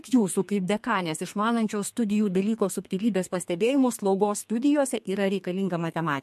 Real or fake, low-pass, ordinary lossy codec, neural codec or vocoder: fake; 14.4 kHz; MP3, 64 kbps; codec, 32 kHz, 1.9 kbps, SNAC